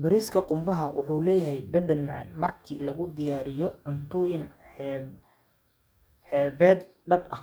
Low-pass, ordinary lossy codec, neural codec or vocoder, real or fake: none; none; codec, 44.1 kHz, 2.6 kbps, DAC; fake